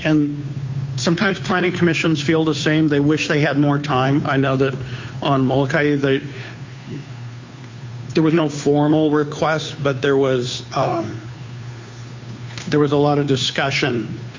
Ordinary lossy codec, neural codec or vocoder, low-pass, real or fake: MP3, 48 kbps; codec, 16 kHz, 2 kbps, FunCodec, trained on Chinese and English, 25 frames a second; 7.2 kHz; fake